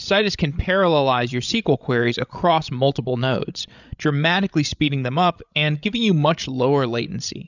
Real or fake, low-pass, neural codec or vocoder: fake; 7.2 kHz; codec, 16 kHz, 16 kbps, FreqCodec, larger model